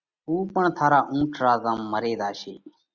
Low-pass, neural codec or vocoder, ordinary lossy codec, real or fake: 7.2 kHz; none; Opus, 64 kbps; real